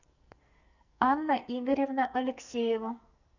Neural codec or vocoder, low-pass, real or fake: codec, 44.1 kHz, 2.6 kbps, SNAC; 7.2 kHz; fake